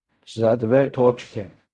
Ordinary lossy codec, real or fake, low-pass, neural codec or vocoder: Opus, 64 kbps; fake; 10.8 kHz; codec, 16 kHz in and 24 kHz out, 0.4 kbps, LongCat-Audio-Codec, fine tuned four codebook decoder